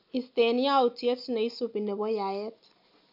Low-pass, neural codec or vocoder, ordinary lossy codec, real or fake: 5.4 kHz; none; none; real